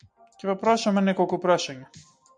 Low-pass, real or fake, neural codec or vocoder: 9.9 kHz; real; none